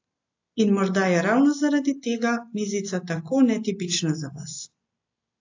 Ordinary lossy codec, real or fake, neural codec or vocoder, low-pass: AAC, 48 kbps; real; none; 7.2 kHz